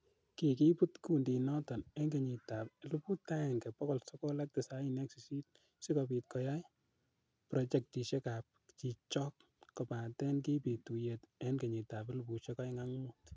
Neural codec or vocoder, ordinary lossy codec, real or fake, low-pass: none; none; real; none